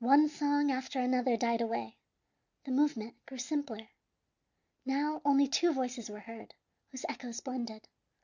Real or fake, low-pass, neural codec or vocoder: real; 7.2 kHz; none